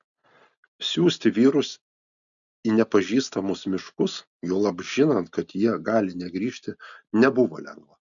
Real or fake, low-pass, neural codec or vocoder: real; 7.2 kHz; none